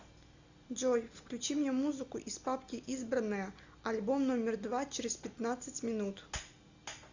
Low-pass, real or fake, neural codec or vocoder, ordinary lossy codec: 7.2 kHz; real; none; Opus, 64 kbps